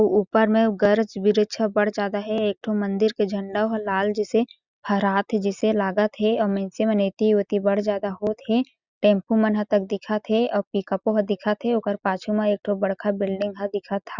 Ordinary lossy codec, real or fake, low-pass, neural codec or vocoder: Opus, 64 kbps; real; 7.2 kHz; none